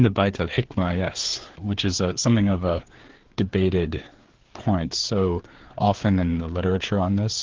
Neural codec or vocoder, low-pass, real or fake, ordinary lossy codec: codec, 16 kHz, 4 kbps, FreqCodec, larger model; 7.2 kHz; fake; Opus, 16 kbps